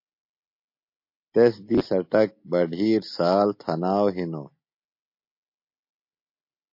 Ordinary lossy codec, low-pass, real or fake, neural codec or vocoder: MP3, 32 kbps; 5.4 kHz; real; none